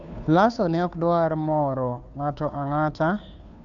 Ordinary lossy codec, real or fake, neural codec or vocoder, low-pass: none; fake; codec, 16 kHz, 2 kbps, FunCodec, trained on Chinese and English, 25 frames a second; 7.2 kHz